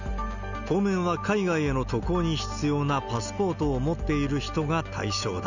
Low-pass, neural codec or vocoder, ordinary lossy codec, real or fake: 7.2 kHz; none; none; real